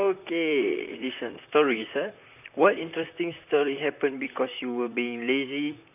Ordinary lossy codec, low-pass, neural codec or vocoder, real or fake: none; 3.6 kHz; vocoder, 44.1 kHz, 128 mel bands, Pupu-Vocoder; fake